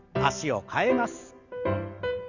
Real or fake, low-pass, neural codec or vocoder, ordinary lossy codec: real; 7.2 kHz; none; Opus, 64 kbps